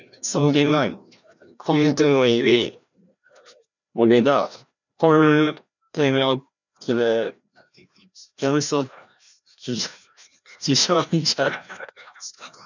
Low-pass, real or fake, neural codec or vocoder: 7.2 kHz; fake; codec, 16 kHz, 1 kbps, FreqCodec, larger model